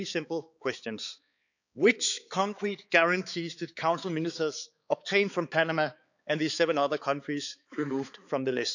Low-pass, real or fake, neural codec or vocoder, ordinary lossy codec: 7.2 kHz; fake; codec, 16 kHz, 4 kbps, X-Codec, HuBERT features, trained on balanced general audio; none